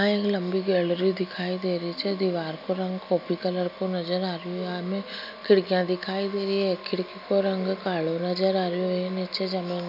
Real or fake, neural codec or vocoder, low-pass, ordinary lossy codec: real; none; 5.4 kHz; none